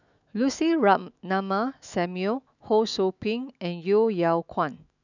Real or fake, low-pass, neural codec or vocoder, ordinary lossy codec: fake; 7.2 kHz; autoencoder, 48 kHz, 128 numbers a frame, DAC-VAE, trained on Japanese speech; none